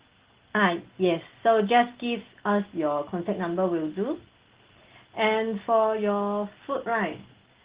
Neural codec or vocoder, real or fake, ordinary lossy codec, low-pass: none; real; Opus, 16 kbps; 3.6 kHz